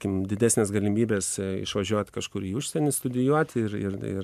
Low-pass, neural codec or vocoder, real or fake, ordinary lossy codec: 14.4 kHz; none; real; MP3, 96 kbps